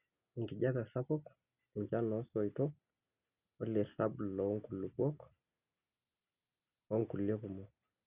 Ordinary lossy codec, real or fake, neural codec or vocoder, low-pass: Opus, 64 kbps; real; none; 3.6 kHz